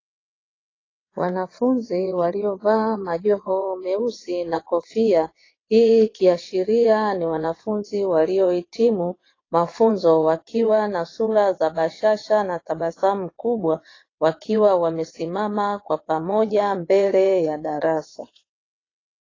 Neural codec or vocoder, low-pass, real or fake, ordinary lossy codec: vocoder, 22.05 kHz, 80 mel bands, WaveNeXt; 7.2 kHz; fake; AAC, 32 kbps